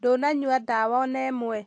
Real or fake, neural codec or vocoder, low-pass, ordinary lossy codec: real; none; 9.9 kHz; AAC, 48 kbps